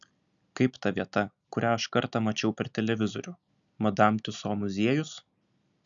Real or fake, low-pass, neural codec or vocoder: real; 7.2 kHz; none